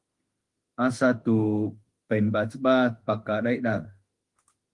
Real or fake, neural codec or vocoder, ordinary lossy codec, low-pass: fake; autoencoder, 48 kHz, 32 numbers a frame, DAC-VAE, trained on Japanese speech; Opus, 24 kbps; 10.8 kHz